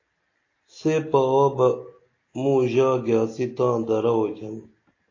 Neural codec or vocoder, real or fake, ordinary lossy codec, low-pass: none; real; AAC, 32 kbps; 7.2 kHz